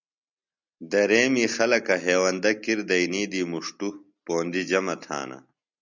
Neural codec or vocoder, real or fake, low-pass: none; real; 7.2 kHz